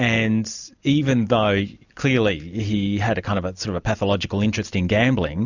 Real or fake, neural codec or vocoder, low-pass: real; none; 7.2 kHz